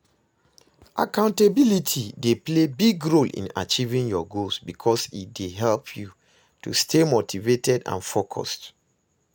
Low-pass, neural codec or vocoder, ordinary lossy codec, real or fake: none; none; none; real